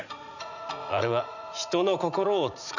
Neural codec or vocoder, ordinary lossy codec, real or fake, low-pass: vocoder, 44.1 kHz, 80 mel bands, Vocos; none; fake; 7.2 kHz